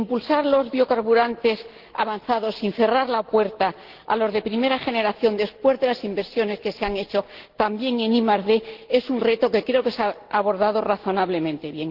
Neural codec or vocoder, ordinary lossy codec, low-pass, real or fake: none; Opus, 16 kbps; 5.4 kHz; real